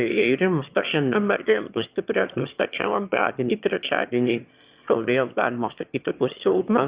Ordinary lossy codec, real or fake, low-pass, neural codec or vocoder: Opus, 64 kbps; fake; 3.6 kHz; autoencoder, 22.05 kHz, a latent of 192 numbers a frame, VITS, trained on one speaker